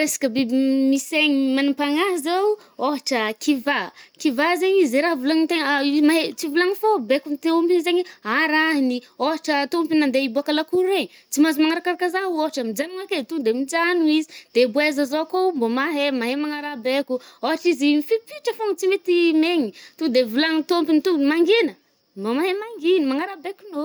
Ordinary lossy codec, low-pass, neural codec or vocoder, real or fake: none; none; none; real